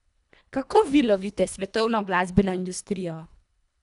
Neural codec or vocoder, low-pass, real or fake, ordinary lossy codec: codec, 24 kHz, 1.5 kbps, HILCodec; 10.8 kHz; fake; none